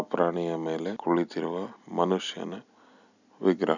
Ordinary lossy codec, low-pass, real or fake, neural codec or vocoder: none; 7.2 kHz; real; none